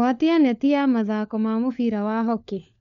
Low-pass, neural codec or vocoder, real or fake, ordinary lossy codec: 7.2 kHz; codec, 16 kHz, 4 kbps, FunCodec, trained on LibriTTS, 50 frames a second; fake; none